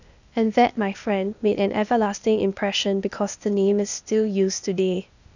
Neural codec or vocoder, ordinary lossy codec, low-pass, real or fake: codec, 16 kHz, 0.8 kbps, ZipCodec; none; 7.2 kHz; fake